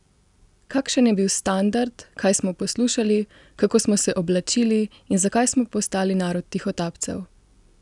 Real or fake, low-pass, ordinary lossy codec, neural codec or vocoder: real; 10.8 kHz; none; none